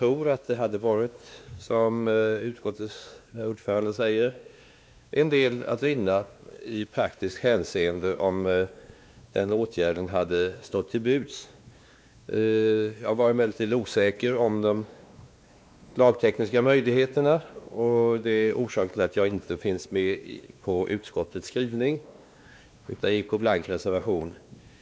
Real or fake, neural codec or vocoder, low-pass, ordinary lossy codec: fake; codec, 16 kHz, 2 kbps, X-Codec, WavLM features, trained on Multilingual LibriSpeech; none; none